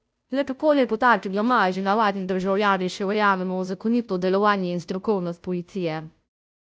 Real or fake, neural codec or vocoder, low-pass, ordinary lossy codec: fake; codec, 16 kHz, 0.5 kbps, FunCodec, trained on Chinese and English, 25 frames a second; none; none